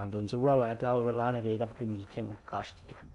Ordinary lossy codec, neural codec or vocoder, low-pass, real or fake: none; codec, 16 kHz in and 24 kHz out, 0.6 kbps, FocalCodec, streaming, 2048 codes; 10.8 kHz; fake